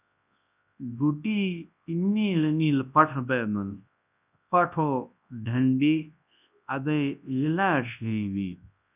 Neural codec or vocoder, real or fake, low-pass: codec, 24 kHz, 0.9 kbps, WavTokenizer, large speech release; fake; 3.6 kHz